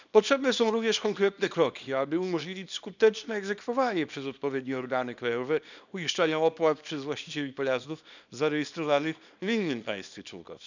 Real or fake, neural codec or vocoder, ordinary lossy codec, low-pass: fake; codec, 24 kHz, 0.9 kbps, WavTokenizer, small release; none; 7.2 kHz